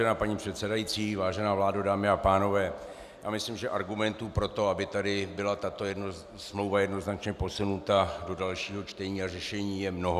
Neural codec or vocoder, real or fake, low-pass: none; real; 14.4 kHz